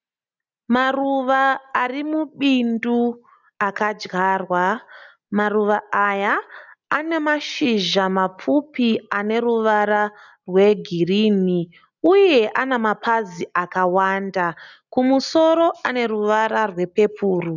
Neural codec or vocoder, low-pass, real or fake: none; 7.2 kHz; real